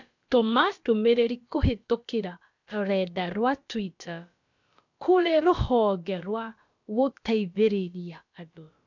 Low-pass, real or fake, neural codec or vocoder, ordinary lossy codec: 7.2 kHz; fake; codec, 16 kHz, about 1 kbps, DyCAST, with the encoder's durations; none